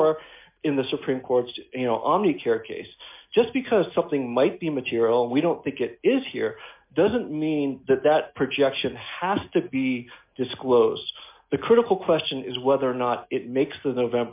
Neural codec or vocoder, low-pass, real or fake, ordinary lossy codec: none; 3.6 kHz; real; MP3, 32 kbps